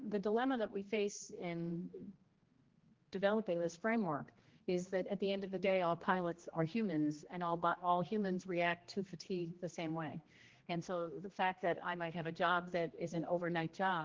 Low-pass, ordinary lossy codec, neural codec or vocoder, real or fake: 7.2 kHz; Opus, 16 kbps; codec, 16 kHz, 1 kbps, X-Codec, HuBERT features, trained on general audio; fake